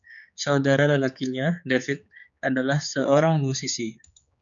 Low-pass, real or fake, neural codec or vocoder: 7.2 kHz; fake; codec, 16 kHz, 4 kbps, X-Codec, HuBERT features, trained on general audio